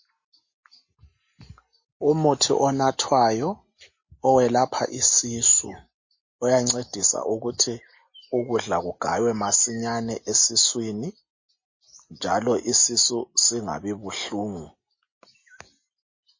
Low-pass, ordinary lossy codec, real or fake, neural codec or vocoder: 7.2 kHz; MP3, 32 kbps; real; none